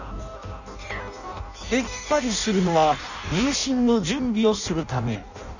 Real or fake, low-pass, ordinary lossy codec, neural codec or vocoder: fake; 7.2 kHz; none; codec, 16 kHz in and 24 kHz out, 0.6 kbps, FireRedTTS-2 codec